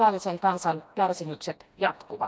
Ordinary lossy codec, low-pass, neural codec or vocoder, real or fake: none; none; codec, 16 kHz, 1 kbps, FreqCodec, smaller model; fake